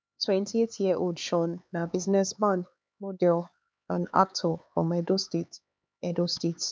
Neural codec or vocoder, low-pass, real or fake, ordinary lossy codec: codec, 16 kHz, 4 kbps, X-Codec, HuBERT features, trained on LibriSpeech; none; fake; none